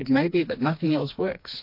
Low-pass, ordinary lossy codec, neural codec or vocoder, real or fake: 5.4 kHz; MP3, 32 kbps; codec, 16 kHz, 2 kbps, FreqCodec, smaller model; fake